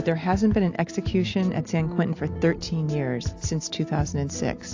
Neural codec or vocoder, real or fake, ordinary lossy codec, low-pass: none; real; AAC, 48 kbps; 7.2 kHz